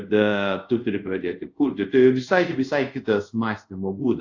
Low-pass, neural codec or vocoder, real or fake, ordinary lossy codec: 7.2 kHz; codec, 24 kHz, 0.5 kbps, DualCodec; fake; AAC, 48 kbps